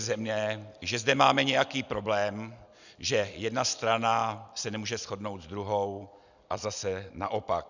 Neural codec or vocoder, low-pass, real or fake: none; 7.2 kHz; real